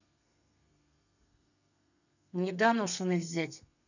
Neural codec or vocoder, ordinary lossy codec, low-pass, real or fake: codec, 32 kHz, 1.9 kbps, SNAC; none; 7.2 kHz; fake